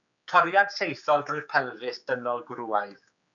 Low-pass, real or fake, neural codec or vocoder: 7.2 kHz; fake; codec, 16 kHz, 4 kbps, X-Codec, HuBERT features, trained on general audio